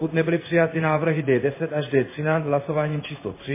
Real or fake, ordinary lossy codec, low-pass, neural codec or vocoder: fake; MP3, 16 kbps; 3.6 kHz; codec, 16 kHz in and 24 kHz out, 1 kbps, XY-Tokenizer